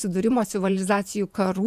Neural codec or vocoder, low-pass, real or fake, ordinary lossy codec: vocoder, 48 kHz, 128 mel bands, Vocos; 14.4 kHz; fake; AAC, 96 kbps